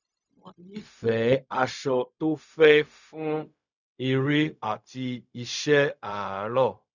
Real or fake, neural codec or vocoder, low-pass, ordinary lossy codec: fake; codec, 16 kHz, 0.4 kbps, LongCat-Audio-Codec; 7.2 kHz; none